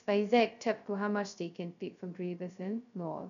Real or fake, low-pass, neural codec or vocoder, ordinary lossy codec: fake; 7.2 kHz; codec, 16 kHz, 0.2 kbps, FocalCodec; none